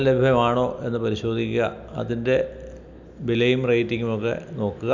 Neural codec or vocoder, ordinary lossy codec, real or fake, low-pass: none; none; real; 7.2 kHz